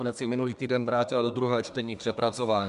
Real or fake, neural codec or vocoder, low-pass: fake; codec, 24 kHz, 1 kbps, SNAC; 10.8 kHz